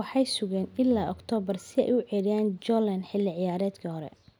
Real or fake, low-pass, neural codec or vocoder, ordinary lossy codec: real; 19.8 kHz; none; none